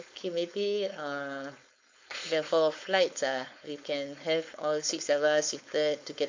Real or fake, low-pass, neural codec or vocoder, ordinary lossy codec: fake; 7.2 kHz; codec, 16 kHz, 4.8 kbps, FACodec; MP3, 48 kbps